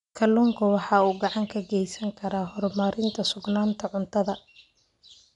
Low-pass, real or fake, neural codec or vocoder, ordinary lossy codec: 10.8 kHz; real; none; none